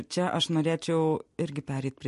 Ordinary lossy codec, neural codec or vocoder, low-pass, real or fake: MP3, 48 kbps; none; 14.4 kHz; real